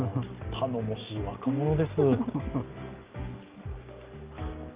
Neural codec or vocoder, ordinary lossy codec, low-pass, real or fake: none; Opus, 32 kbps; 3.6 kHz; real